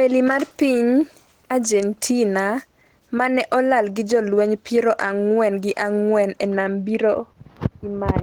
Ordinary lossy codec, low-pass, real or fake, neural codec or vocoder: Opus, 16 kbps; 19.8 kHz; real; none